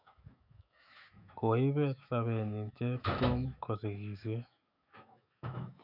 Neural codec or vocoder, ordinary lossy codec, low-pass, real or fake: autoencoder, 48 kHz, 128 numbers a frame, DAC-VAE, trained on Japanese speech; none; 5.4 kHz; fake